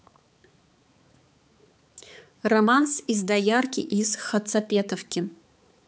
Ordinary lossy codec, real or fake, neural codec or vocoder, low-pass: none; fake; codec, 16 kHz, 4 kbps, X-Codec, HuBERT features, trained on balanced general audio; none